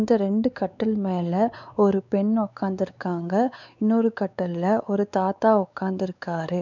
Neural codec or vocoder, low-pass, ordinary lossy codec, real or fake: codec, 16 kHz, 4 kbps, X-Codec, WavLM features, trained on Multilingual LibriSpeech; 7.2 kHz; none; fake